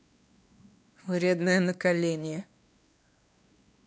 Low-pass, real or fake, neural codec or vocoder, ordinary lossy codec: none; fake; codec, 16 kHz, 2 kbps, X-Codec, WavLM features, trained on Multilingual LibriSpeech; none